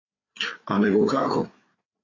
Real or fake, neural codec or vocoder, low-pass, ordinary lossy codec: fake; codec, 16 kHz, 4 kbps, FreqCodec, larger model; 7.2 kHz; AAC, 32 kbps